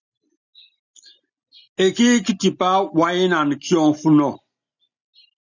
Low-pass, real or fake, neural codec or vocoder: 7.2 kHz; real; none